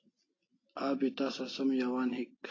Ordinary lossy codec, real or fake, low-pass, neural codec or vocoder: AAC, 32 kbps; real; 7.2 kHz; none